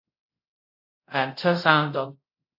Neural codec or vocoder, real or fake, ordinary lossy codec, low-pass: codec, 24 kHz, 0.5 kbps, DualCodec; fake; AAC, 32 kbps; 5.4 kHz